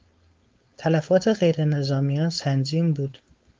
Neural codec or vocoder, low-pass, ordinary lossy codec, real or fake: codec, 16 kHz, 4.8 kbps, FACodec; 7.2 kHz; Opus, 24 kbps; fake